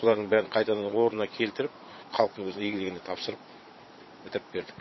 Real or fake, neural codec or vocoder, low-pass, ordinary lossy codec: fake; vocoder, 22.05 kHz, 80 mel bands, Vocos; 7.2 kHz; MP3, 24 kbps